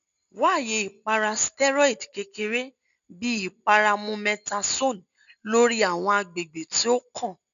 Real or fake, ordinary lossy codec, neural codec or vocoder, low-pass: real; none; none; 7.2 kHz